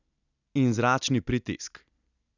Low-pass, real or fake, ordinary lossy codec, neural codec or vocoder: 7.2 kHz; real; none; none